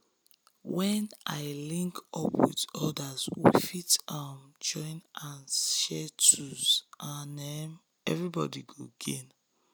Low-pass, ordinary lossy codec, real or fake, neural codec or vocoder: none; none; real; none